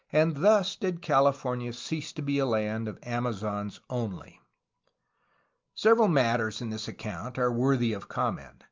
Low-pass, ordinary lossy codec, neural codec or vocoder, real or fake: 7.2 kHz; Opus, 32 kbps; none; real